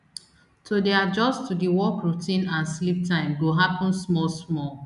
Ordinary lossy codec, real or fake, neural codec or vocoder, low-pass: none; real; none; 10.8 kHz